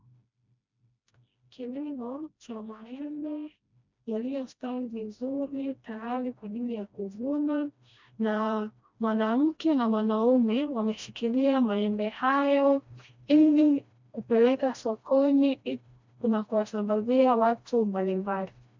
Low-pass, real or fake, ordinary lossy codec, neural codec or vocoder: 7.2 kHz; fake; MP3, 64 kbps; codec, 16 kHz, 1 kbps, FreqCodec, smaller model